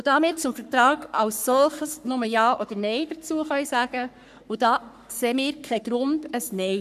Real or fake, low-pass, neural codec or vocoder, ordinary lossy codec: fake; 14.4 kHz; codec, 44.1 kHz, 3.4 kbps, Pupu-Codec; none